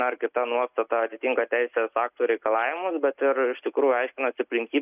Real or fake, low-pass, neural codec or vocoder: real; 3.6 kHz; none